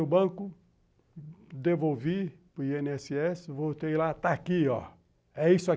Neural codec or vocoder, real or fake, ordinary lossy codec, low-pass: none; real; none; none